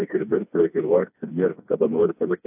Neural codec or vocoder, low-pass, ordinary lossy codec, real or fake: codec, 16 kHz, 2 kbps, FreqCodec, smaller model; 3.6 kHz; AAC, 32 kbps; fake